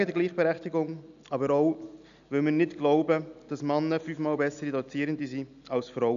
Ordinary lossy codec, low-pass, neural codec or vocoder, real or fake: none; 7.2 kHz; none; real